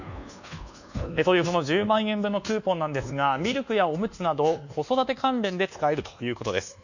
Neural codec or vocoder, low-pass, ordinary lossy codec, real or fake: codec, 24 kHz, 1.2 kbps, DualCodec; 7.2 kHz; none; fake